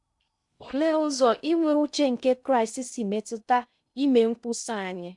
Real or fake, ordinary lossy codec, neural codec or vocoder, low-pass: fake; none; codec, 16 kHz in and 24 kHz out, 0.6 kbps, FocalCodec, streaming, 2048 codes; 10.8 kHz